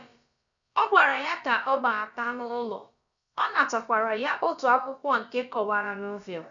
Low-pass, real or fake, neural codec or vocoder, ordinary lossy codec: 7.2 kHz; fake; codec, 16 kHz, about 1 kbps, DyCAST, with the encoder's durations; none